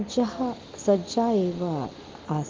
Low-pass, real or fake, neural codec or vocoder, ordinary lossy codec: 7.2 kHz; real; none; Opus, 16 kbps